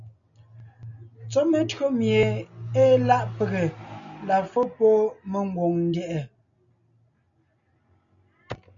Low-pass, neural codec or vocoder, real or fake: 7.2 kHz; none; real